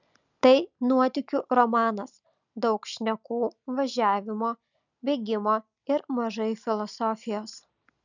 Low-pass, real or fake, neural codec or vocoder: 7.2 kHz; real; none